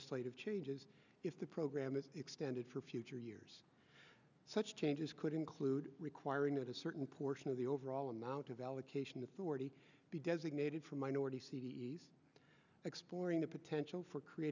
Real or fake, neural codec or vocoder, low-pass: real; none; 7.2 kHz